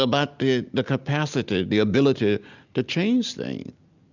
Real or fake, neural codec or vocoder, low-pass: real; none; 7.2 kHz